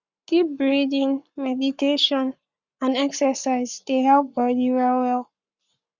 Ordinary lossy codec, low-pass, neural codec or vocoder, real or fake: Opus, 64 kbps; 7.2 kHz; codec, 44.1 kHz, 7.8 kbps, Pupu-Codec; fake